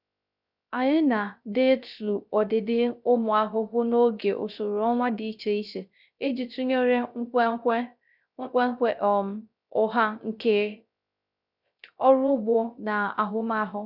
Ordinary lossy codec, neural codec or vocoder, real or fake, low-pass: none; codec, 16 kHz, 0.3 kbps, FocalCodec; fake; 5.4 kHz